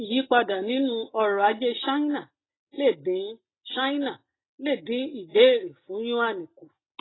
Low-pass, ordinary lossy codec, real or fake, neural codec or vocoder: 7.2 kHz; AAC, 16 kbps; real; none